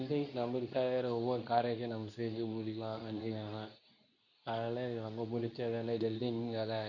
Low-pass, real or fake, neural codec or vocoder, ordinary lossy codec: 7.2 kHz; fake; codec, 24 kHz, 0.9 kbps, WavTokenizer, medium speech release version 2; none